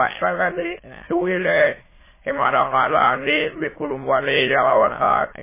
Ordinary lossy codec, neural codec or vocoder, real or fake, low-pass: MP3, 16 kbps; autoencoder, 22.05 kHz, a latent of 192 numbers a frame, VITS, trained on many speakers; fake; 3.6 kHz